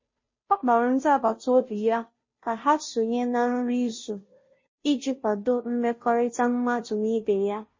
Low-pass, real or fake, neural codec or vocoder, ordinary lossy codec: 7.2 kHz; fake; codec, 16 kHz, 0.5 kbps, FunCodec, trained on Chinese and English, 25 frames a second; MP3, 32 kbps